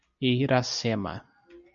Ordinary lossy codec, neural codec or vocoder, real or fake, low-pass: MP3, 64 kbps; none; real; 7.2 kHz